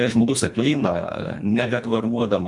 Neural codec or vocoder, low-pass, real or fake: codec, 24 kHz, 1.5 kbps, HILCodec; 10.8 kHz; fake